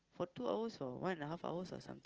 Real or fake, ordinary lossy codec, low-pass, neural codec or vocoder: real; Opus, 16 kbps; 7.2 kHz; none